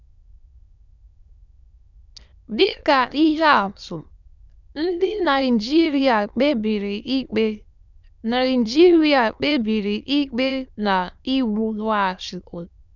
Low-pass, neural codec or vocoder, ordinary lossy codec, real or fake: 7.2 kHz; autoencoder, 22.05 kHz, a latent of 192 numbers a frame, VITS, trained on many speakers; none; fake